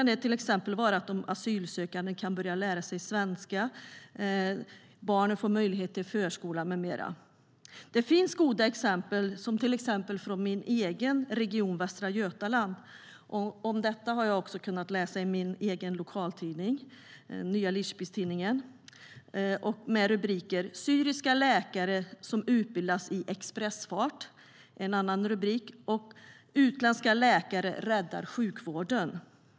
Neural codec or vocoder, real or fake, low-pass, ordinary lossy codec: none; real; none; none